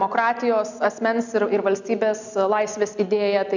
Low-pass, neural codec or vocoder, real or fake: 7.2 kHz; none; real